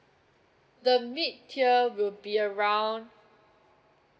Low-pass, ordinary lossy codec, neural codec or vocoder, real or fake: none; none; none; real